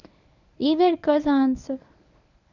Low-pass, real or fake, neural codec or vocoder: 7.2 kHz; fake; codec, 24 kHz, 0.9 kbps, WavTokenizer, medium speech release version 1